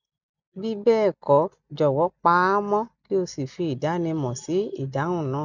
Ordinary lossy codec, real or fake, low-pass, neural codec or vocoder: none; real; 7.2 kHz; none